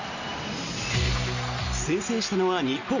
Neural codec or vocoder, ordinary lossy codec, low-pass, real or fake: none; none; 7.2 kHz; real